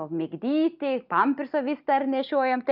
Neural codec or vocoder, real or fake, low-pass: none; real; 5.4 kHz